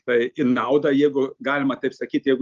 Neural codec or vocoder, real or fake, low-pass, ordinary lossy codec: none; real; 7.2 kHz; Opus, 32 kbps